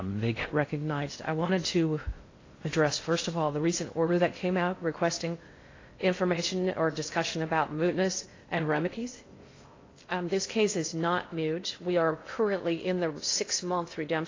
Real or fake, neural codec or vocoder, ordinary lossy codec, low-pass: fake; codec, 16 kHz in and 24 kHz out, 0.6 kbps, FocalCodec, streaming, 2048 codes; AAC, 32 kbps; 7.2 kHz